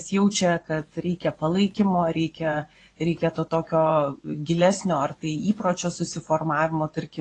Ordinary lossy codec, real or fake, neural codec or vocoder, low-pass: AAC, 32 kbps; fake; vocoder, 24 kHz, 100 mel bands, Vocos; 10.8 kHz